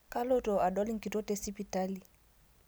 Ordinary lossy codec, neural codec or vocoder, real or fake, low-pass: none; none; real; none